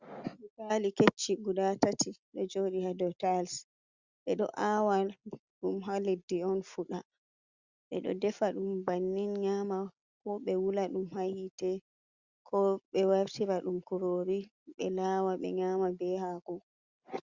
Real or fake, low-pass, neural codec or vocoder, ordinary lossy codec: real; 7.2 kHz; none; Opus, 64 kbps